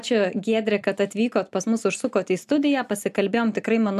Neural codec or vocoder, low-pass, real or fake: none; 14.4 kHz; real